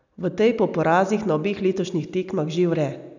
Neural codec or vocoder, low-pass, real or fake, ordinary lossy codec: none; 7.2 kHz; real; none